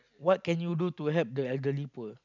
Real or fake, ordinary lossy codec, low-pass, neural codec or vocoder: real; none; 7.2 kHz; none